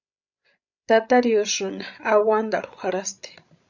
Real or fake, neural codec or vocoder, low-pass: fake; codec, 16 kHz, 16 kbps, FreqCodec, larger model; 7.2 kHz